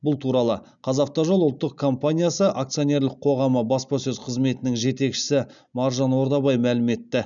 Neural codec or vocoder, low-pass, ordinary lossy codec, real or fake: none; 7.2 kHz; none; real